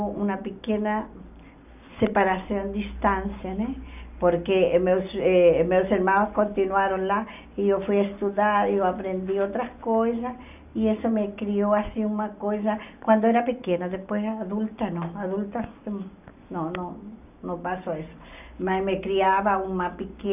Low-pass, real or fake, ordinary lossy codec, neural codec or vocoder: 3.6 kHz; real; none; none